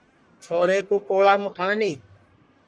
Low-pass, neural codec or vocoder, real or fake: 9.9 kHz; codec, 44.1 kHz, 1.7 kbps, Pupu-Codec; fake